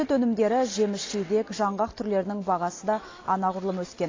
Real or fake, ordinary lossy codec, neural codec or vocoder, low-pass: real; AAC, 32 kbps; none; 7.2 kHz